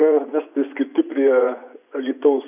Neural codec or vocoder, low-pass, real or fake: vocoder, 24 kHz, 100 mel bands, Vocos; 3.6 kHz; fake